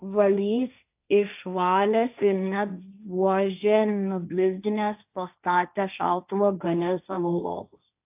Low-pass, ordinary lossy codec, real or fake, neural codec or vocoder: 3.6 kHz; AAC, 32 kbps; fake; codec, 16 kHz, 1.1 kbps, Voila-Tokenizer